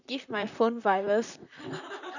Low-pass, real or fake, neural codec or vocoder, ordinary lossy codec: 7.2 kHz; fake; vocoder, 44.1 kHz, 128 mel bands, Pupu-Vocoder; none